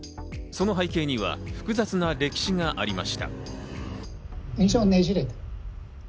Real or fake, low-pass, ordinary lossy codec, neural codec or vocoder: real; none; none; none